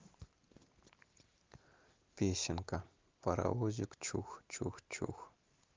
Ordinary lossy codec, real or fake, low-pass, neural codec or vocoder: Opus, 32 kbps; real; 7.2 kHz; none